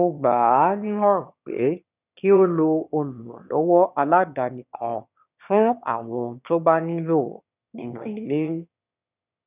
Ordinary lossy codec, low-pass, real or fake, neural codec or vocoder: none; 3.6 kHz; fake; autoencoder, 22.05 kHz, a latent of 192 numbers a frame, VITS, trained on one speaker